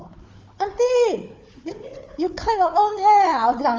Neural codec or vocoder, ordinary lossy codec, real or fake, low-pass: codec, 16 kHz, 4 kbps, FunCodec, trained on Chinese and English, 50 frames a second; Opus, 32 kbps; fake; 7.2 kHz